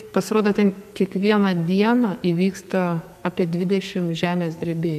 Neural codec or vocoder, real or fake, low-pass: codec, 44.1 kHz, 2.6 kbps, SNAC; fake; 14.4 kHz